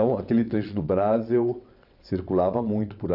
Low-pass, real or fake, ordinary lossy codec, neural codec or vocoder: 5.4 kHz; fake; none; vocoder, 22.05 kHz, 80 mel bands, WaveNeXt